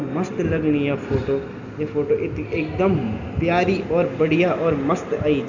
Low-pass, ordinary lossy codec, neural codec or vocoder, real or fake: 7.2 kHz; none; none; real